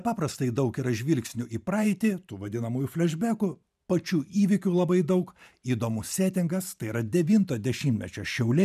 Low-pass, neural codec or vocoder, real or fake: 14.4 kHz; vocoder, 44.1 kHz, 128 mel bands every 512 samples, BigVGAN v2; fake